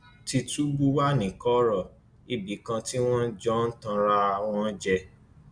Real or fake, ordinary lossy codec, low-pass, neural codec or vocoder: real; none; 9.9 kHz; none